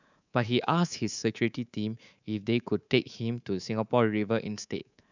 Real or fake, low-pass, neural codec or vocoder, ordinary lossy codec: fake; 7.2 kHz; codec, 24 kHz, 3.1 kbps, DualCodec; none